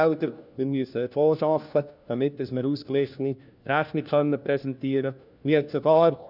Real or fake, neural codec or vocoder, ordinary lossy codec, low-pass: fake; codec, 16 kHz, 1 kbps, FunCodec, trained on LibriTTS, 50 frames a second; none; 5.4 kHz